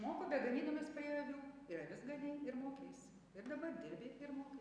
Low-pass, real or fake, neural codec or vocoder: 9.9 kHz; real; none